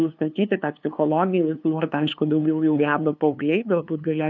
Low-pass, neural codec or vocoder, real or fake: 7.2 kHz; codec, 16 kHz, 2 kbps, FunCodec, trained on LibriTTS, 25 frames a second; fake